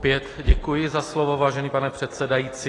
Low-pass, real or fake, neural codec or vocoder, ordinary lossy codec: 10.8 kHz; real; none; AAC, 32 kbps